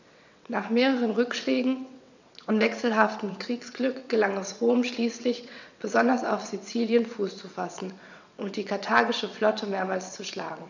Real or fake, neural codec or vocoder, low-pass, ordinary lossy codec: fake; vocoder, 22.05 kHz, 80 mel bands, WaveNeXt; 7.2 kHz; none